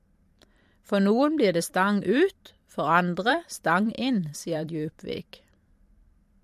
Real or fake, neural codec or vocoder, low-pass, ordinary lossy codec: fake; vocoder, 44.1 kHz, 128 mel bands every 512 samples, BigVGAN v2; 14.4 kHz; MP3, 64 kbps